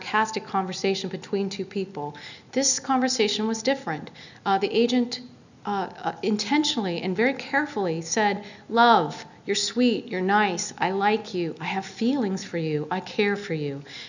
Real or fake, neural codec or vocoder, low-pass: real; none; 7.2 kHz